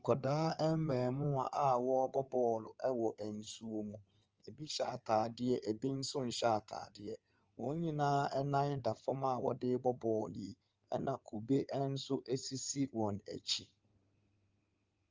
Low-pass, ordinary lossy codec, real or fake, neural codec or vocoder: 7.2 kHz; Opus, 24 kbps; fake; codec, 16 kHz in and 24 kHz out, 2.2 kbps, FireRedTTS-2 codec